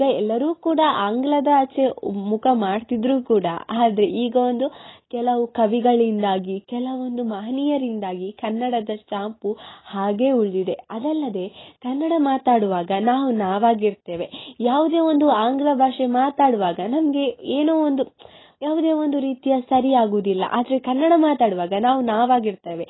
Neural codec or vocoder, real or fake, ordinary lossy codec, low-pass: none; real; AAC, 16 kbps; 7.2 kHz